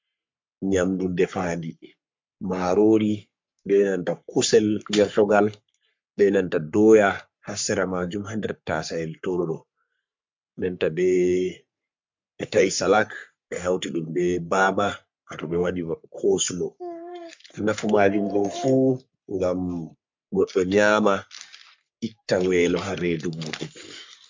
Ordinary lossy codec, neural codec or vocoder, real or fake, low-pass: MP3, 64 kbps; codec, 44.1 kHz, 3.4 kbps, Pupu-Codec; fake; 7.2 kHz